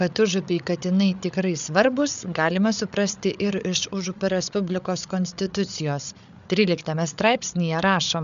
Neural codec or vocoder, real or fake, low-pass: codec, 16 kHz, 8 kbps, FreqCodec, larger model; fake; 7.2 kHz